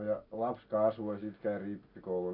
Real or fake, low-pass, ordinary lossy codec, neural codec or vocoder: real; 5.4 kHz; none; none